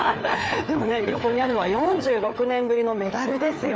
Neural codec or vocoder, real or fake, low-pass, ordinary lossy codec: codec, 16 kHz, 4 kbps, FreqCodec, larger model; fake; none; none